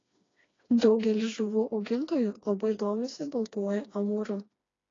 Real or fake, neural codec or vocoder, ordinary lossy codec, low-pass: fake; codec, 16 kHz, 2 kbps, FreqCodec, smaller model; AAC, 32 kbps; 7.2 kHz